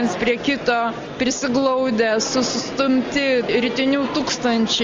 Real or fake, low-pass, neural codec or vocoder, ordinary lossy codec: real; 7.2 kHz; none; Opus, 32 kbps